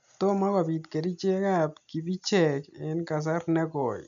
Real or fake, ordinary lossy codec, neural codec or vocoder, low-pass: real; none; none; 7.2 kHz